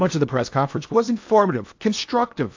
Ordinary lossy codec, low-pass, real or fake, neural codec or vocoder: AAC, 48 kbps; 7.2 kHz; fake; codec, 16 kHz in and 24 kHz out, 0.8 kbps, FocalCodec, streaming, 65536 codes